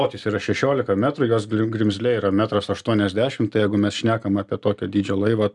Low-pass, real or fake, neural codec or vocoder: 10.8 kHz; real; none